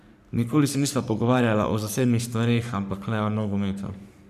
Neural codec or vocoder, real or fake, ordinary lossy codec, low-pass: codec, 44.1 kHz, 3.4 kbps, Pupu-Codec; fake; none; 14.4 kHz